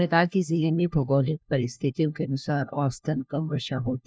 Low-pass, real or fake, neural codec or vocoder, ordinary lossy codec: none; fake; codec, 16 kHz, 1 kbps, FunCodec, trained on LibriTTS, 50 frames a second; none